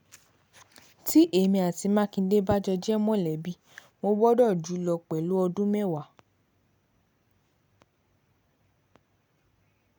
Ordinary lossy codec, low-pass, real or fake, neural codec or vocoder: none; none; real; none